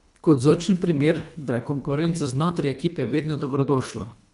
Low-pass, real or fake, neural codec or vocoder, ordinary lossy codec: 10.8 kHz; fake; codec, 24 kHz, 1.5 kbps, HILCodec; none